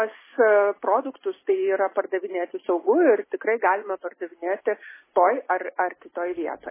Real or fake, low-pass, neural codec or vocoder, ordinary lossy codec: real; 3.6 kHz; none; MP3, 16 kbps